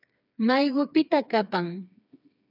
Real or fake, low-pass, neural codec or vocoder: fake; 5.4 kHz; codec, 16 kHz, 4 kbps, FreqCodec, smaller model